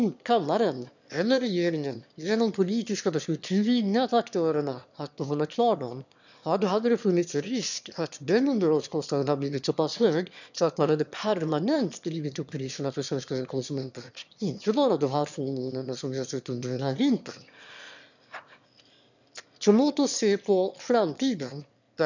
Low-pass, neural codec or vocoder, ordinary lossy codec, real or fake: 7.2 kHz; autoencoder, 22.05 kHz, a latent of 192 numbers a frame, VITS, trained on one speaker; none; fake